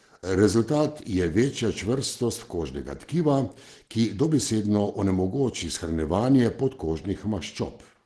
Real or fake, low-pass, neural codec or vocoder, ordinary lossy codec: real; 10.8 kHz; none; Opus, 16 kbps